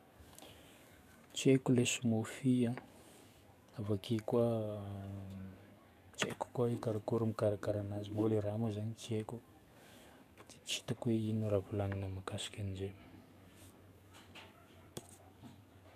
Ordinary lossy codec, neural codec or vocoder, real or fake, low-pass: none; codec, 44.1 kHz, 7.8 kbps, DAC; fake; 14.4 kHz